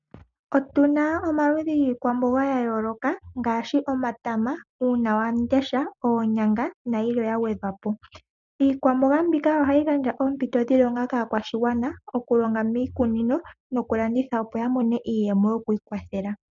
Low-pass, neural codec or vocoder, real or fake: 7.2 kHz; none; real